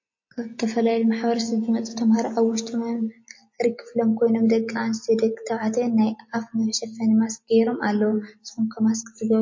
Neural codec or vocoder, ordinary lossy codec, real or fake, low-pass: none; MP3, 32 kbps; real; 7.2 kHz